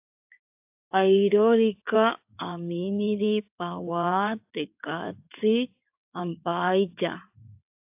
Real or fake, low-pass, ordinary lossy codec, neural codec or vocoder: fake; 3.6 kHz; AAC, 32 kbps; codec, 16 kHz in and 24 kHz out, 2.2 kbps, FireRedTTS-2 codec